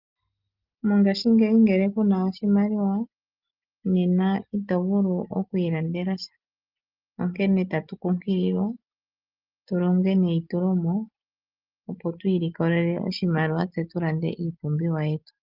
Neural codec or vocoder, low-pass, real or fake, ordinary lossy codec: none; 5.4 kHz; real; Opus, 32 kbps